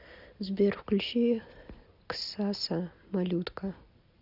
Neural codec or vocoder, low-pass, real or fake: none; 5.4 kHz; real